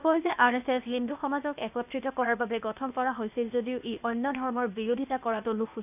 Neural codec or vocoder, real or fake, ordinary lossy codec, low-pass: codec, 16 kHz, 0.8 kbps, ZipCodec; fake; none; 3.6 kHz